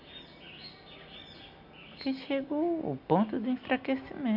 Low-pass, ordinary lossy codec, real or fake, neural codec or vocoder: 5.4 kHz; MP3, 48 kbps; real; none